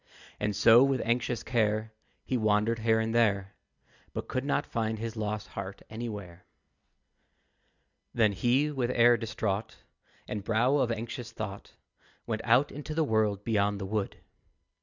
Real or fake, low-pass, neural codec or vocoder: real; 7.2 kHz; none